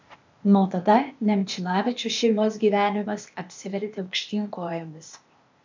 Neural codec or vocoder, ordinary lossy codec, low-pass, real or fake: codec, 16 kHz, 0.8 kbps, ZipCodec; MP3, 64 kbps; 7.2 kHz; fake